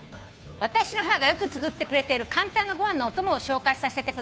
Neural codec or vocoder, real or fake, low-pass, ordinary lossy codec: codec, 16 kHz, 2 kbps, FunCodec, trained on Chinese and English, 25 frames a second; fake; none; none